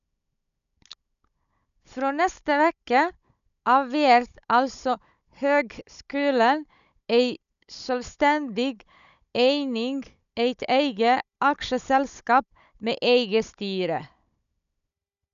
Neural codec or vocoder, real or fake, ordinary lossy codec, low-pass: codec, 16 kHz, 16 kbps, FunCodec, trained on Chinese and English, 50 frames a second; fake; none; 7.2 kHz